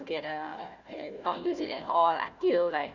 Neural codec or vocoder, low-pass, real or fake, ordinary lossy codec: codec, 16 kHz, 1 kbps, FunCodec, trained on Chinese and English, 50 frames a second; 7.2 kHz; fake; none